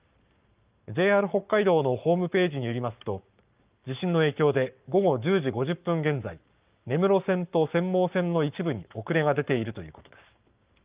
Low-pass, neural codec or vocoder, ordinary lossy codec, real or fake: 3.6 kHz; codec, 44.1 kHz, 7.8 kbps, Pupu-Codec; Opus, 24 kbps; fake